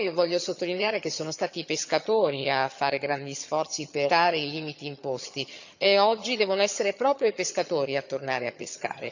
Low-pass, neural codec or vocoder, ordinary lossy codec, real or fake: 7.2 kHz; vocoder, 22.05 kHz, 80 mel bands, HiFi-GAN; none; fake